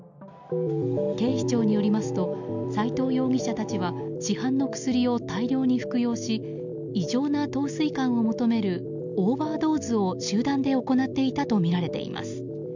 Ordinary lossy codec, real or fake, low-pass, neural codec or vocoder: none; real; 7.2 kHz; none